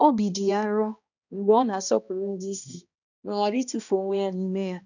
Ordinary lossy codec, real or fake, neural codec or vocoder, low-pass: none; fake; codec, 16 kHz, 1 kbps, X-Codec, HuBERT features, trained on balanced general audio; 7.2 kHz